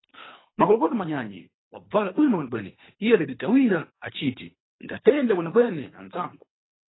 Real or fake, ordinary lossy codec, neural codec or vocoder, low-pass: fake; AAC, 16 kbps; codec, 24 kHz, 3 kbps, HILCodec; 7.2 kHz